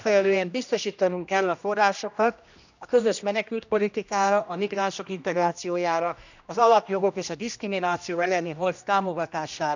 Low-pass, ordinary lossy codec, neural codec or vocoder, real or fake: 7.2 kHz; none; codec, 16 kHz, 1 kbps, X-Codec, HuBERT features, trained on general audio; fake